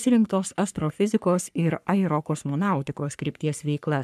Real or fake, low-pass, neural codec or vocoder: fake; 14.4 kHz; codec, 44.1 kHz, 3.4 kbps, Pupu-Codec